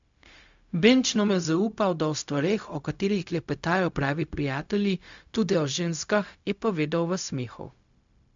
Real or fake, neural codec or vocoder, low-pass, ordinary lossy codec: fake; codec, 16 kHz, 0.4 kbps, LongCat-Audio-Codec; 7.2 kHz; MP3, 64 kbps